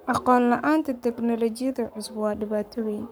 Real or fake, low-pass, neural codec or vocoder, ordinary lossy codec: fake; none; codec, 44.1 kHz, 7.8 kbps, Pupu-Codec; none